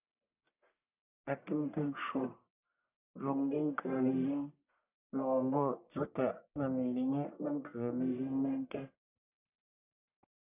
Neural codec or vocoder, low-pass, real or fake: codec, 44.1 kHz, 1.7 kbps, Pupu-Codec; 3.6 kHz; fake